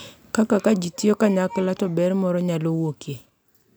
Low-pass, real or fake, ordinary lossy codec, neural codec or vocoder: none; real; none; none